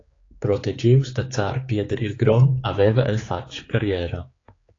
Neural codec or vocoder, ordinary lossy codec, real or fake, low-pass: codec, 16 kHz, 4 kbps, X-Codec, HuBERT features, trained on general audio; AAC, 32 kbps; fake; 7.2 kHz